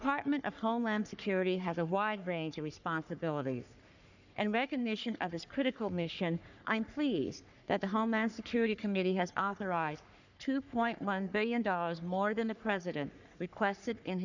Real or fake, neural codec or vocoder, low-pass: fake; codec, 44.1 kHz, 3.4 kbps, Pupu-Codec; 7.2 kHz